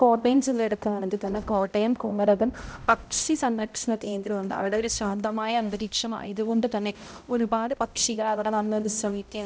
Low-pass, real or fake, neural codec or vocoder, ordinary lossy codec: none; fake; codec, 16 kHz, 0.5 kbps, X-Codec, HuBERT features, trained on balanced general audio; none